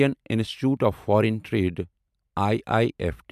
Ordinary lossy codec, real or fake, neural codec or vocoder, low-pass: MP3, 96 kbps; fake; vocoder, 44.1 kHz, 128 mel bands every 512 samples, BigVGAN v2; 14.4 kHz